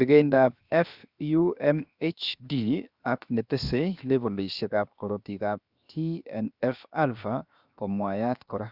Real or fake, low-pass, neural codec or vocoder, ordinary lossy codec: fake; 5.4 kHz; codec, 16 kHz, 0.7 kbps, FocalCodec; Opus, 64 kbps